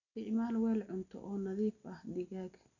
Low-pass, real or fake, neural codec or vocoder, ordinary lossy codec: 7.2 kHz; real; none; none